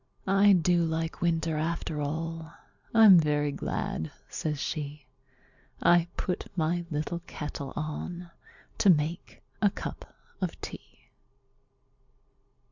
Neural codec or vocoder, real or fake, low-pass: none; real; 7.2 kHz